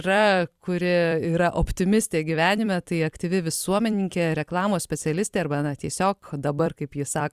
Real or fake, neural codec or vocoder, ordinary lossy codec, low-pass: fake; vocoder, 44.1 kHz, 128 mel bands every 256 samples, BigVGAN v2; Opus, 64 kbps; 14.4 kHz